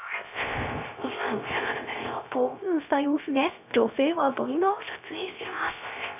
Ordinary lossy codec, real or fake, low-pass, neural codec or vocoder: none; fake; 3.6 kHz; codec, 16 kHz, 0.3 kbps, FocalCodec